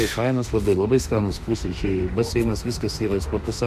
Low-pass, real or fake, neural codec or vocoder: 14.4 kHz; fake; autoencoder, 48 kHz, 32 numbers a frame, DAC-VAE, trained on Japanese speech